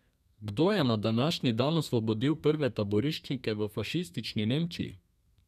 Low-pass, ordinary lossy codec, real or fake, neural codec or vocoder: 14.4 kHz; none; fake; codec, 32 kHz, 1.9 kbps, SNAC